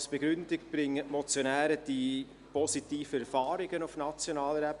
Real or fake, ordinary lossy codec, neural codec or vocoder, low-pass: real; Opus, 64 kbps; none; 10.8 kHz